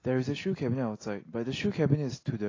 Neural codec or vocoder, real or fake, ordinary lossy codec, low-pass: none; real; AAC, 32 kbps; 7.2 kHz